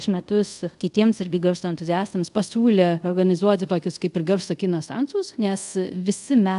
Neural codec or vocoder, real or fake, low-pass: codec, 24 kHz, 0.5 kbps, DualCodec; fake; 10.8 kHz